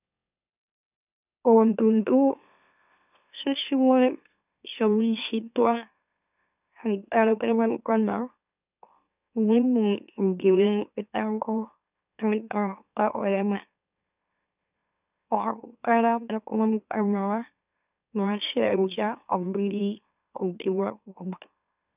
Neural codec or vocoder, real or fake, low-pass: autoencoder, 44.1 kHz, a latent of 192 numbers a frame, MeloTTS; fake; 3.6 kHz